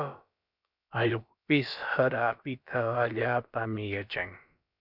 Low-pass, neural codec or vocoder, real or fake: 5.4 kHz; codec, 16 kHz, about 1 kbps, DyCAST, with the encoder's durations; fake